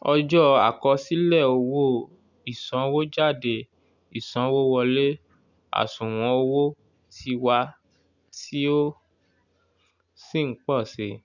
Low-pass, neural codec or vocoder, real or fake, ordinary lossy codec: 7.2 kHz; none; real; none